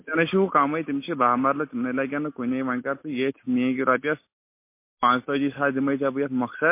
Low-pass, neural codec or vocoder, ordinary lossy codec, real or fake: 3.6 kHz; none; MP3, 24 kbps; real